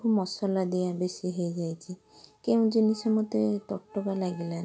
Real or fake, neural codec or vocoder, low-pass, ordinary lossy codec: real; none; none; none